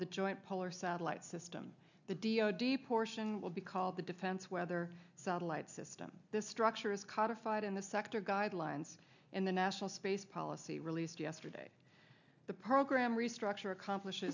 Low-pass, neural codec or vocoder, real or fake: 7.2 kHz; none; real